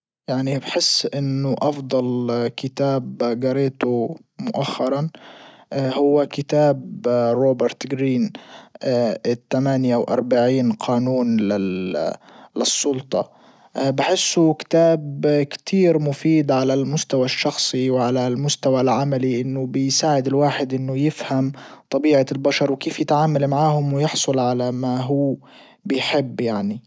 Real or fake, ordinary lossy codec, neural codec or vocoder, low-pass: real; none; none; none